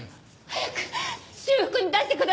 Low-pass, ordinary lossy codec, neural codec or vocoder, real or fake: none; none; none; real